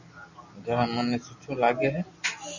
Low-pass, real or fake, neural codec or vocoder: 7.2 kHz; real; none